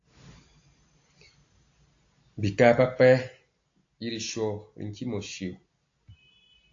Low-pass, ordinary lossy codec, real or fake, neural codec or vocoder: 7.2 kHz; AAC, 48 kbps; real; none